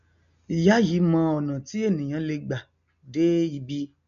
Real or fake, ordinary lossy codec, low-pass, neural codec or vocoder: real; MP3, 96 kbps; 7.2 kHz; none